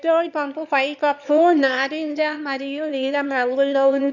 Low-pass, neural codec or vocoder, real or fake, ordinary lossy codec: 7.2 kHz; autoencoder, 22.05 kHz, a latent of 192 numbers a frame, VITS, trained on one speaker; fake; none